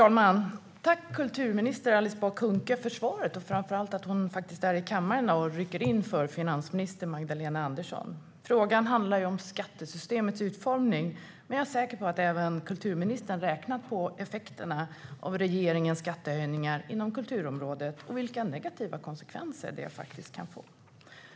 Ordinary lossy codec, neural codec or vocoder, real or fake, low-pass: none; none; real; none